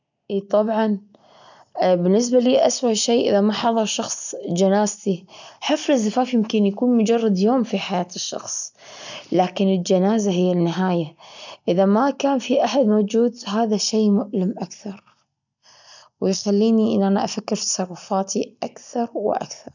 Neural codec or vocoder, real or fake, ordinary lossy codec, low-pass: none; real; none; 7.2 kHz